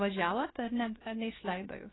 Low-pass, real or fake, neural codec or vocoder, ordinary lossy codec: 7.2 kHz; fake; codec, 16 kHz, about 1 kbps, DyCAST, with the encoder's durations; AAC, 16 kbps